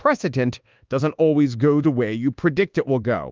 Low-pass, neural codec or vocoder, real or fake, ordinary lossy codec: 7.2 kHz; codec, 24 kHz, 1.2 kbps, DualCodec; fake; Opus, 32 kbps